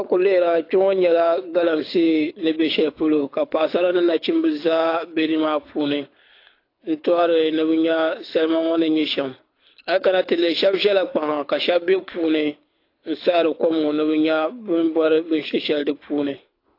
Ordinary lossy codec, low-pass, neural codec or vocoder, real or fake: AAC, 32 kbps; 5.4 kHz; codec, 24 kHz, 6 kbps, HILCodec; fake